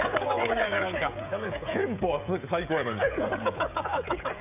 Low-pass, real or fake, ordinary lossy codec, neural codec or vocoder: 3.6 kHz; fake; none; codec, 16 kHz, 16 kbps, FreqCodec, smaller model